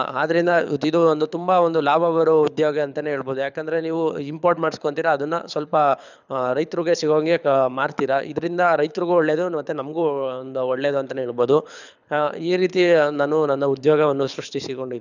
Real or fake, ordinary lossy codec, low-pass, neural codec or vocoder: fake; none; 7.2 kHz; codec, 24 kHz, 6 kbps, HILCodec